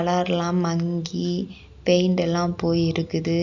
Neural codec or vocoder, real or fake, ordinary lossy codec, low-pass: none; real; none; 7.2 kHz